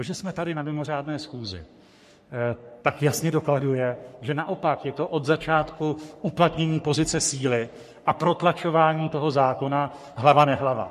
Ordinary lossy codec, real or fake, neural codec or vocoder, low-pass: MP3, 64 kbps; fake; codec, 44.1 kHz, 3.4 kbps, Pupu-Codec; 14.4 kHz